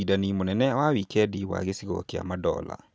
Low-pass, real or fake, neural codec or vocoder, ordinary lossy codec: none; real; none; none